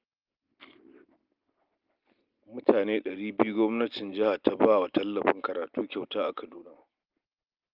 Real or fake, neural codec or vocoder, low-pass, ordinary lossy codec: real; none; 5.4 kHz; Opus, 32 kbps